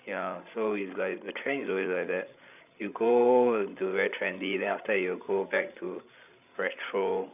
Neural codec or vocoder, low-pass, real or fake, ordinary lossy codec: codec, 16 kHz, 16 kbps, FreqCodec, larger model; 3.6 kHz; fake; none